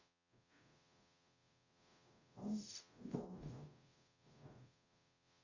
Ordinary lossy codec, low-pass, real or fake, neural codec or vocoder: none; 7.2 kHz; fake; codec, 44.1 kHz, 0.9 kbps, DAC